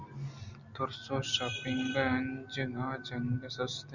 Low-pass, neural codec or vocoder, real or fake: 7.2 kHz; none; real